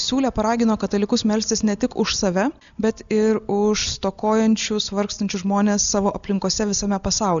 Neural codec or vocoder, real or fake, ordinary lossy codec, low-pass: none; real; MP3, 96 kbps; 7.2 kHz